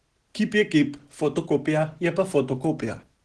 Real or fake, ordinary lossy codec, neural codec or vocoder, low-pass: real; Opus, 16 kbps; none; 10.8 kHz